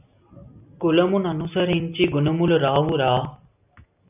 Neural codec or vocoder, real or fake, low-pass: none; real; 3.6 kHz